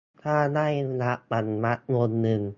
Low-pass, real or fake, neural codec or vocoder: 7.2 kHz; real; none